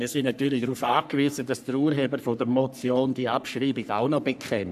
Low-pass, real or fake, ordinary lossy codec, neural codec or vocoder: 14.4 kHz; fake; none; codec, 44.1 kHz, 3.4 kbps, Pupu-Codec